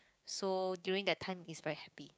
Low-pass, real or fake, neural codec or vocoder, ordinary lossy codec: none; fake; codec, 16 kHz, 6 kbps, DAC; none